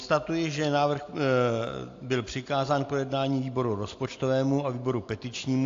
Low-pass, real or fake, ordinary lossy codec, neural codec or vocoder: 7.2 kHz; real; AAC, 48 kbps; none